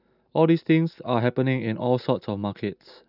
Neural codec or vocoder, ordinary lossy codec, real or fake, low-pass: none; none; real; 5.4 kHz